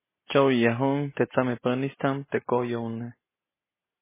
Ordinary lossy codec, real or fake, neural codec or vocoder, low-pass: MP3, 16 kbps; real; none; 3.6 kHz